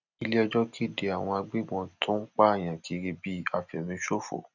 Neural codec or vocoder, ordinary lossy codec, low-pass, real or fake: none; none; 7.2 kHz; real